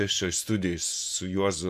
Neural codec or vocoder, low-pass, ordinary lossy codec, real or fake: vocoder, 48 kHz, 128 mel bands, Vocos; 14.4 kHz; AAC, 96 kbps; fake